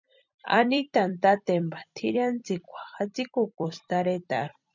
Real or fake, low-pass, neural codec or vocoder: fake; 7.2 kHz; vocoder, 44.1 kHz, 128 mel bands every 256 samples, BigVGAN v2